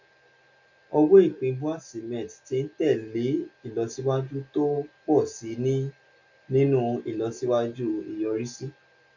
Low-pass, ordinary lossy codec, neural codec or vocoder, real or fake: 7.2 kHz; none; none; real